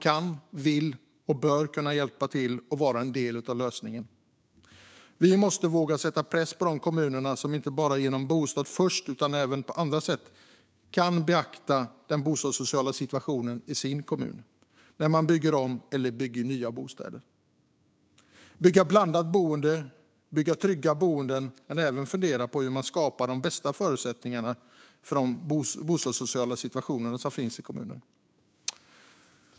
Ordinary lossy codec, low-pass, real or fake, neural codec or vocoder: none; none; fake; codec, 16 kHz, 6 kbps, DAC